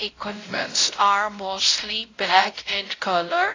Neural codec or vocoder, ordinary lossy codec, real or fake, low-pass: codec, 16 kHz, 0.5 kbps, X-Codec, WavLM features, trained on Multilingual LibriSpeech; AAC, 32 kbps; fake; 7.2 kHz